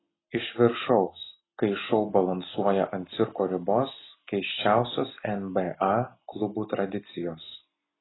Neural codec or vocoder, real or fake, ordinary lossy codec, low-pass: none; real; AAC, 16 kbps; 7.2 kHz